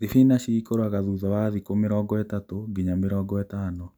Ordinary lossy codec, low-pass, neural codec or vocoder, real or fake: none; none; none; real